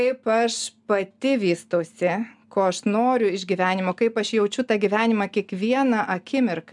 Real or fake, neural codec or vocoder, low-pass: real; none; 10.8 kHz